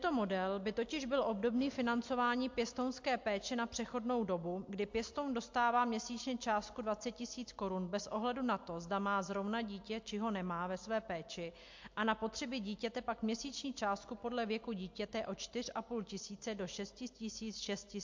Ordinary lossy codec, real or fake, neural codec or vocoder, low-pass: MP3, 48 kbps; real; none; 7.2 kHz